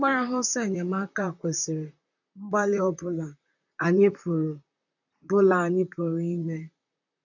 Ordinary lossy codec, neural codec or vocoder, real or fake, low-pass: none; vocoder, 44.1 kHz, 128 mel bands, Pupu-Vocoder; fake; 7.2 kHz